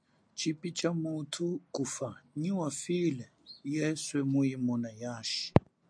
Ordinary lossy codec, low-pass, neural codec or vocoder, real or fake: MP3, 64 kbps; 9.9 kHz; none; real